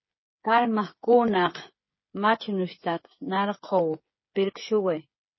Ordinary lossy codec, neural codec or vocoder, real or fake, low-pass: MP3, 24 kbps; codec, 16 kHz, 8 kbps, FreqCodec, smaller model; fake; 7.2 kHz